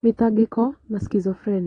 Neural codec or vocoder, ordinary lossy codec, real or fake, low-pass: vocoder, 22.05 kHz, 80 mel bands, Vocos; AAC, 32 kbps; fake; 9.9 kHz